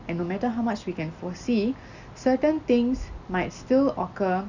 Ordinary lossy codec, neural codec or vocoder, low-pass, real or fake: Opus, 64 kbps; none; 7.2 kHz; real